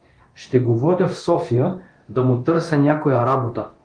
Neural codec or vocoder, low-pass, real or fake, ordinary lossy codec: codec, 24 kHz, 0.9 kbps, DualCodec; 9.9 kHz; fake; Opus, 24 kbps